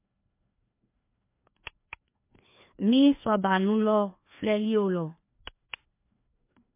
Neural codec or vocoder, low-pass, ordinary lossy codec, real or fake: codec, 16 kHz, 2 kbps, FreqCodec, larger model; 3.6 kHz; MP3, 24 kbps; fake